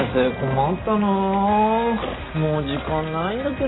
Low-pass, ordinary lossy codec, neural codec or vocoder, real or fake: 7.2 kHz; AAC, 16 kbps; none; real